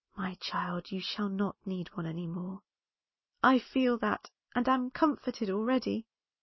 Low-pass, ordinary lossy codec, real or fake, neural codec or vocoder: 7.2 kHz; MP3, 24 kbps; real; none